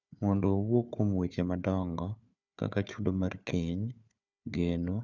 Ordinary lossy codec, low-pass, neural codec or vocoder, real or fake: none; 7.2 kHz; codec, 16 kHz, 4 kbps, FunCodec, trained on Chinese and English, 50 frames a second; fake